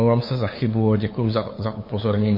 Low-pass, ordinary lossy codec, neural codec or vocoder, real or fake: 5.4 kHz; MP3, 24 kbps; codec, 16 kHz, 8 kbps, FunCodec, trained on LibriTTS, 25 frames a second; fake